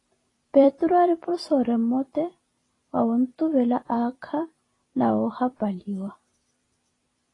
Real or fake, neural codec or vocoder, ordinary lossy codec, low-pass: fake; vocoder, 44.1 kHz, 128 mel bands every 256 samples, BigVGAN v2; AAC, 32 kbps; 10.8 kHz